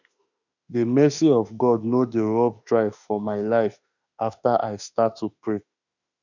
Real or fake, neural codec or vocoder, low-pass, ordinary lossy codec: fake; autoencoder, 48 kHz, 32 numbers a frame, DAC-VAE, trained on Japanese speech; 7.2 kHz; none